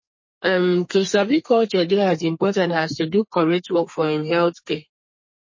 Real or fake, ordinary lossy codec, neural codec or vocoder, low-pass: fake; MP3, 32 kbps; codec, 32 kHz, 1.9 kbps, SNAC; 7.2 kHz